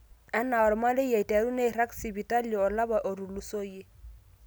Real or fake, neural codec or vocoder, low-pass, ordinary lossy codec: real; none; none; none